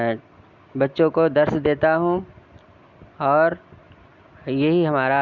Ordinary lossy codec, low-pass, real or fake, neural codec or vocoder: Opus, 64 kbps; 7.2 kHz; real; none